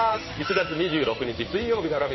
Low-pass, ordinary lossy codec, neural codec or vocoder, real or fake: 7.2 kHz; MP3, 24 kbps; codec, 16 kHz in and 24 kHz out, 1 kbps, XY-Tokenizer; fake